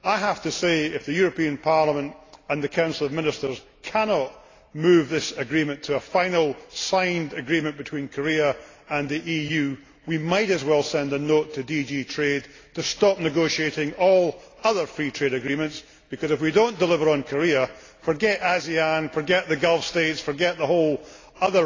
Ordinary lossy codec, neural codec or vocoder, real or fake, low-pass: AAC, 32 kbps; none; real; 7.2 kHz